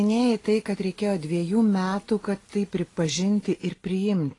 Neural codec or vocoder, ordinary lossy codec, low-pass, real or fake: none; AAC, 32 kbps; 10.8 kHz; real